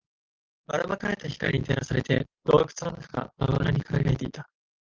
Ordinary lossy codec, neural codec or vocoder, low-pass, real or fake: Opus, 16 kbps; none; 7.2 kHz; real